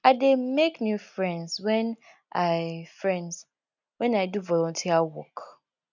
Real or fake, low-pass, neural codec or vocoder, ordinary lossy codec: real; 7.2 kHz; none; none